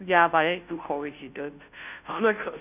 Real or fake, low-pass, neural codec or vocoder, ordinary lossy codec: fake; 3.6 kHz; codec, 16 kHz, 0.5 kbps, FunCodec, trained on Chinese and English, 25 frames a second; none